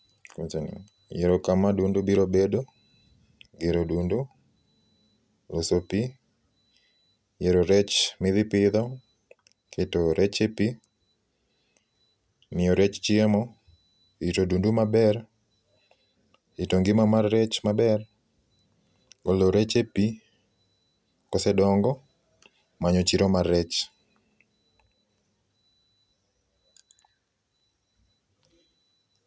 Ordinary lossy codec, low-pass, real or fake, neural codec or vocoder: none; none; real; none